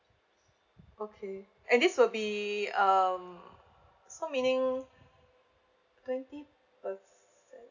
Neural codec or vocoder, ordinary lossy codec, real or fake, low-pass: none; none; real; 7.2 kHz